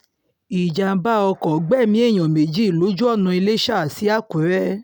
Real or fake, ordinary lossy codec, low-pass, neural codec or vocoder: real; none; none; none